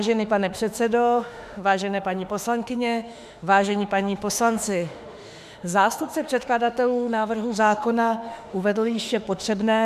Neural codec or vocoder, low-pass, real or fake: autoencoder, 48 kHz, 32 numbers a frame, DAC-VAE, trained on Japanese speech; 14.4 kHz; fake